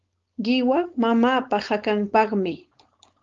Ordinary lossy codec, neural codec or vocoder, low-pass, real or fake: Opus, 32 kbps; codec, 16 kHz, 4.8 kbps, FACodec; 7.2 kHz; fake